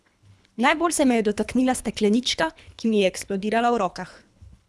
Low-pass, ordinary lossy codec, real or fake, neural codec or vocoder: none; none; fake; codec, 24 kHz, 3 kbps, HILCodec